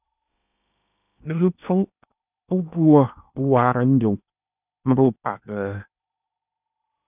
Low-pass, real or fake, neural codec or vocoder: 3.6 kHz; fake; codec, 16 kHz in and 24 kHz out, 0.8 kbps, FocalCodec, streaming, 65536 codes